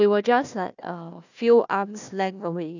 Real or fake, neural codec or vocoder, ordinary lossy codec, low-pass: fake; codec, 16 kHz, 1 kbps, FunCodec, trained on Chinese and English, 50 frames a second; none; 7.2 kHz